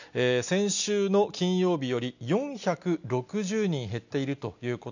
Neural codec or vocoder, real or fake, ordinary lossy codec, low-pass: none; real; none; 7.2 kHz